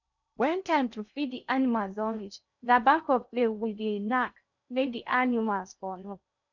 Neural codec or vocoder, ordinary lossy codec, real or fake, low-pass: codec, 16 kHz in and 24 kHz out, 0.6 kbps, FocalCodec, streaming, 2048 codes; none; fake; 7.2 kHz